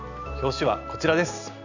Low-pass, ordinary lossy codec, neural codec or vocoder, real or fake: 7.2 kHz; none; none; real